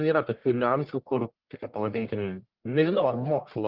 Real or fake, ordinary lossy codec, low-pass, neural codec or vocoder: fake; Opus, 16 kbps; 5.4 kHz; codec, 44.1 kHz, 1.7 kbps, Pupu-Codec